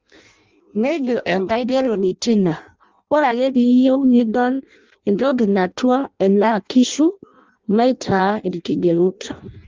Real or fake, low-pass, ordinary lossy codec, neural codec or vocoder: fake; 7.2 kHz; Opus, 32 kbps; codec, 16 kHz in and 24 kHz out, 0.6 kbps, FireRedTTS-2 codec